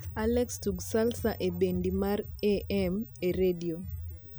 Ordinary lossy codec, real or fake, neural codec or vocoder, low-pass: none; real; none; none